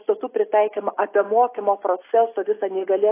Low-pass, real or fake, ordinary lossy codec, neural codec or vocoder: 3.6 kHz; real; AAC, 24 kbps; none